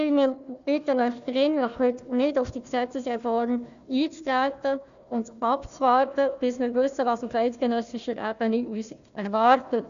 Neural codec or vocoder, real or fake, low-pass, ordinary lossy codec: codec, 16 kHz, 1 kbps, FunCodec, trained on Chinese and English, 50 frames a second; fake; 7.2 kHz; none